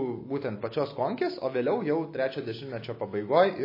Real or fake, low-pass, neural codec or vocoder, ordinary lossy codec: real; 5.4 kHz; none; MP3, 24 kbps